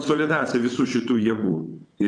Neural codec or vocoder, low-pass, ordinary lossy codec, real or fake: vocoder, 22.05 kHz, 80 mel bands, WaveNeXt; 9.9 kHz; AAC, 64 kbps; fake